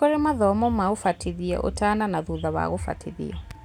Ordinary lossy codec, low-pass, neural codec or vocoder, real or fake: none; 19.8 kHz; none; real